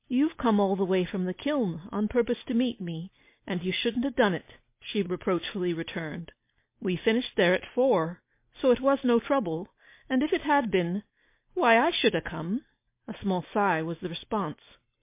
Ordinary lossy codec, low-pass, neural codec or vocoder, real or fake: MP3, 24 kbps; 3.6 kHz; none; real